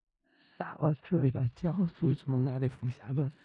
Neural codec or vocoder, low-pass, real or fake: codec, 16 kHz in and 24 kHz out, 0.4 kbps, LongCat-Audio-Codec, four codebook decoder; 10.8 kHz; fake